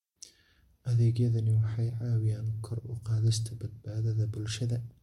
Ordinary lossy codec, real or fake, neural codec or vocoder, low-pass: MP3, 64 kbps; real; none; 19.8 kHz